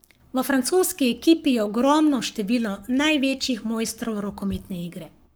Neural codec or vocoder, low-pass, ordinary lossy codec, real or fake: codec, 44.1 kHz, 7.8 kbps, Pupu-Codec; none; none; fake